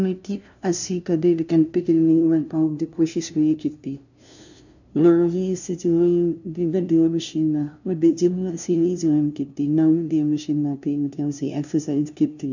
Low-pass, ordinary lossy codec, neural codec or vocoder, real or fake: 7.2 kHz; none; codec, 16 kHz, 0.5 kbps, FunCodec, trained on LibriTTS, 25 frames a second; fake